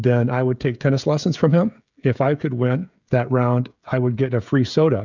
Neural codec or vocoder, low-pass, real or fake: codec, 16 kHz, 16 kbps, FreqCodec, smaller model; 7.2 kHz; fake